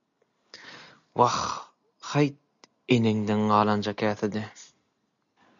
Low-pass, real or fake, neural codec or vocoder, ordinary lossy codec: 7.2 kHz; real; none; MP3, 96 kbps